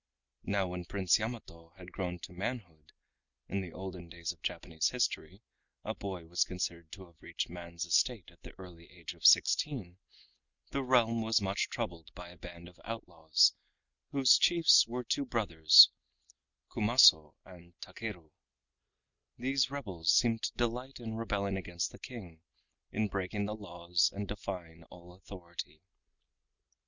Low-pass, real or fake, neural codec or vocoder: 7.2 kHz; real; none